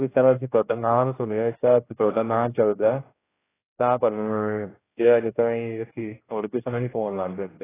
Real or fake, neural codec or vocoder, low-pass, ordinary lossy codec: fake; codec, 16 kHz, 1 kbps, X-Codec, HuBERT features, trained on general audio; 3.6 kHz; AAC, 16 kbps